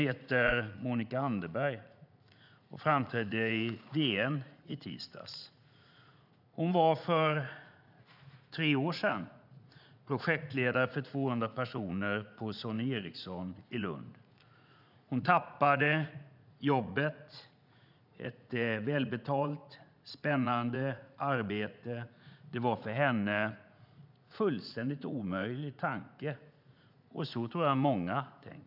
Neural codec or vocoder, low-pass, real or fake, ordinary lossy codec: vocoder, 44.1 kHz, 80 mel bands, Vocos; 5.4 kHz; fake; none